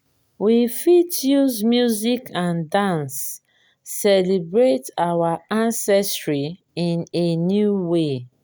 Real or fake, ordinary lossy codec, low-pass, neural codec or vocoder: real; none; none; none